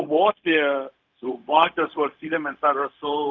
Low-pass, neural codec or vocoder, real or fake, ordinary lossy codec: 7.2 kHz; codec, 16 kHz, 0.4 kbps, LongCat-Audio-Codec; fake; Opus, 32 kbps